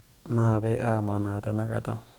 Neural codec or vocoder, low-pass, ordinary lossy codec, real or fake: codec, 44.1 kHz, 2.6 kbps, DAC; 19.8 kHz; none; fake